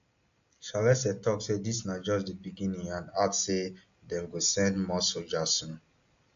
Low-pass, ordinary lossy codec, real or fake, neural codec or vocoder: 7.2 kHz; AAC, 64 kbps; real; none